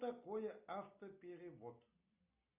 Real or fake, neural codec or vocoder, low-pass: real; none; 3.6 kHz